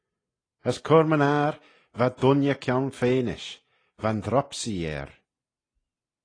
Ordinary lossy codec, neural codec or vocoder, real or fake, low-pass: AAC, 32 kbps; none; real; 9.9 kHz